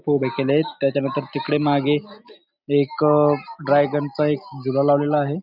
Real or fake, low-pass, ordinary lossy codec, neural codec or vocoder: real; 5.4 kHz; none; none